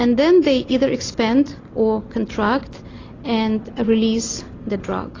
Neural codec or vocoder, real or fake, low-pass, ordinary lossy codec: none; real; 7.2 kHz; AAC, 32 kbps